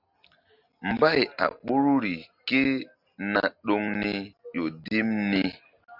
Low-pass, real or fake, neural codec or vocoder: 5.4 kHz; real; none